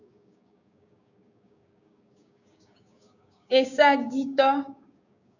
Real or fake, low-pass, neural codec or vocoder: fake; 7.2 kHz; codec, 16 kHz, 6 kbps, DAC